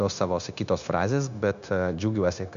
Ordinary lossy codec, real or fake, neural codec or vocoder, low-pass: AAC, 96 kbps; fake; codec, 16 kHz, 0.9 kbps, LongCat-Audio-Codec; 7.2 kHz